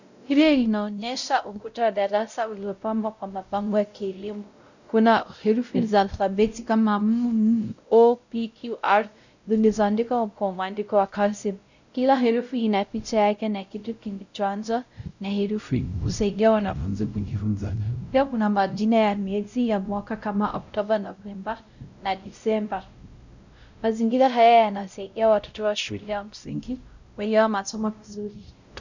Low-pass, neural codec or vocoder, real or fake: 7.2 kHz; codec, 16 kHz, 0.5 kbps, X-Codec, WavLM features, trained on Multilingual LibriSpeech; fake